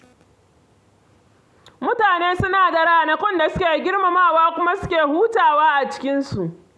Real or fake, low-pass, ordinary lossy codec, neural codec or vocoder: real; none; none; none